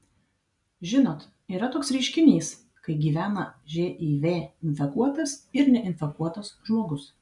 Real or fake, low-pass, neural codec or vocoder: real; 10.8 kHz; none